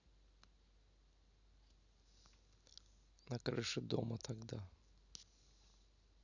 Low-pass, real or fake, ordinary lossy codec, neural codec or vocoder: 7.2 kHz; real; none; none